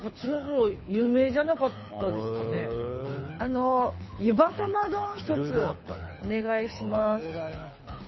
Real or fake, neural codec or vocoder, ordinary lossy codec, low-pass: fake; codec, 24 kHz, 6 kbps, HILCodec; MP3, 24 kbps; 7.2 kHz